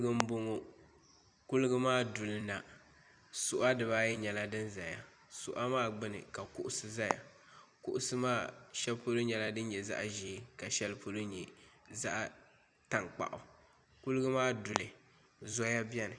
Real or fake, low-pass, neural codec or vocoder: fake; 9.9 kHz; vocoder, 44.1 kHz, 128 mel bands every 256 samples, BigVGAN v2